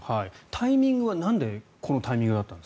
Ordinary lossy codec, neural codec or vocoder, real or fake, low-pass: none; none; real; none